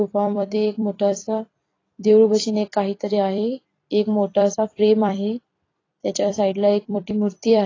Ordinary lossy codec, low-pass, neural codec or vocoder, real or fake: AAC, 32 kbps; 7.2 kHz; vocoder, 44.1 kHz, 128 mel bands every 256 samples, BigVGAN v2; fake